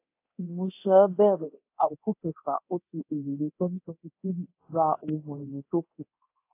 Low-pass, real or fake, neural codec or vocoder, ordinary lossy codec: 3.6 kHz; fake; codec, 24 kHz, 0.9 kbps, DualCodec; AAC, 24 kbps